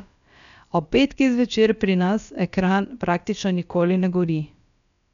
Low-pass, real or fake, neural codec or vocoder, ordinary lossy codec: 7.2 kHz; fake; codec, 16 kHz, about 1 kbps, DyCAST, with the encoder's durations; none